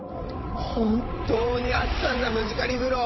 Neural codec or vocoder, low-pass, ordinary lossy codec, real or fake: codec, 16 kHz, 8 kbps, FreqCodec, larger model; 7.2 kHz; MP3, 24 kbps; fake